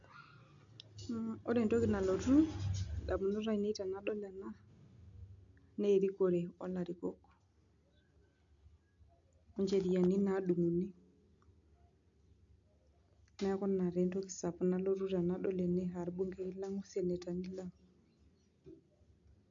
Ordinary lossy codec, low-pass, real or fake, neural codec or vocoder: MP3, 64 kbps; 7.2 kHz; real; none